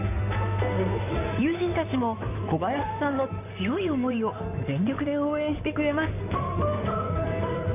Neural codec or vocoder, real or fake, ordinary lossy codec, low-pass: codec, 16 kHz in and 24 kHz out, 2.2 kbps, FireRedTTS-2 codec; fake; none; 3.6 kHz